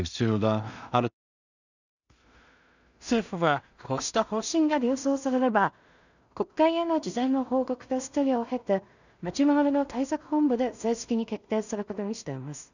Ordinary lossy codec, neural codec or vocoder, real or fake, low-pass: none; codec, 16 kHz in and 24 kHz out, 0.4 kbps, LongCat-Audio-Codec, two codebook decoder; fake; 7.2 kHz